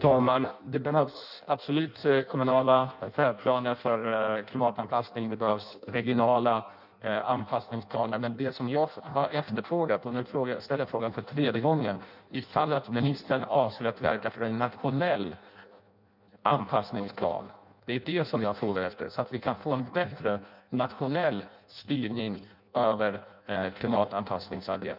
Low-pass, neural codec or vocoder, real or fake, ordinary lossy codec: 5.4 kHz; codec, 16 kHz in and 24 kHz out, 0.6 kbps, FireRedTTS-2 codec; fake; none